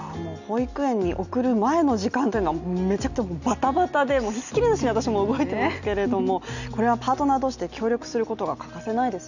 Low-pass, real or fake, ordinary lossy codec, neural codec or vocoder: 7.2 kHz; real; none; none